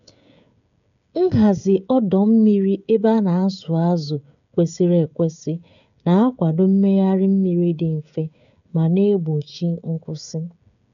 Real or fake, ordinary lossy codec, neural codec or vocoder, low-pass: fake; none; codec, 16 kHz, 16 kbps, FreqCodec, smaller model; 7.2 kHz